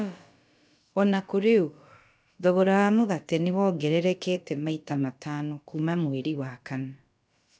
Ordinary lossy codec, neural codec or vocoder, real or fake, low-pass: none; codec, 16 kHz, about 1 kbps, DyCAST, with the encoder's durations; fake; none